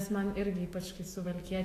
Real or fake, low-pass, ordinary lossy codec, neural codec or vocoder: fake; 14.4 kHz; AAC, 48 kbps; autoencoder, 48 kHz, 128 numbers a frame, DAC-VAE, trained on Japanese speech